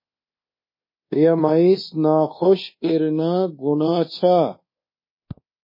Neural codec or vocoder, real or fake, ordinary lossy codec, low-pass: codec, 24 kHz, 1.2 kbps, DualCodec; fake; MP3, 24 kbps; 5.4 kHz